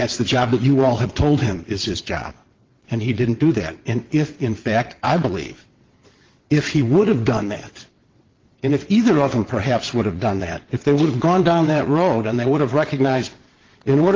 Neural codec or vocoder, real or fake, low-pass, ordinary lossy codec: vocoder, 22.05 kHz, 80 mel bands, WaveNeXt; fake; 7.2 kHz; Opus, 16 kbps